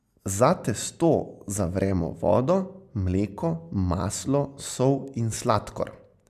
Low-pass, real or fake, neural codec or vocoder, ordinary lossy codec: 14.4 kHz; real; none; MP3, 96 kbps